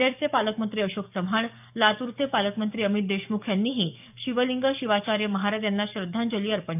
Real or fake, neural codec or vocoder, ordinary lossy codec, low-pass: fake; codec, 44.1 kHz, 7.8 kbps, DAC; none; 3.6 kHz